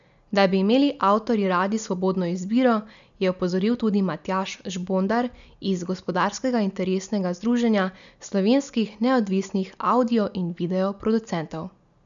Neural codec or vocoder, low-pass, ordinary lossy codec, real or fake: none; 7.2 kHz; none; real